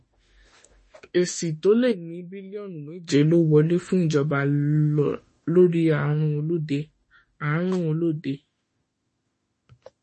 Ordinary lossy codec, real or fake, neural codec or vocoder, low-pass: MP3, 32 kbps; fake; autoencoder, 48 kHz, 32 numbers a frame, DAC-VAE, trained on Japanese speech; 10.8 kHz